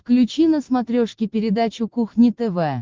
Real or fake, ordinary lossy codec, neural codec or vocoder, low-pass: real; Opus, 16 kbps; none; 7.2 kHz